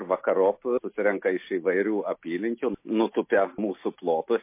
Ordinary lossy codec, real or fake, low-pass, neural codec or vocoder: MP3, 24 kbps; real; 3.6 kHz; none